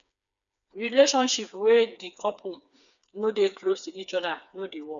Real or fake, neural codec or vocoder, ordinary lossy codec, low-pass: fake; codec, 16 kHz, 4 kbps, FreqCodec, smaller model; none; 7.2 kHz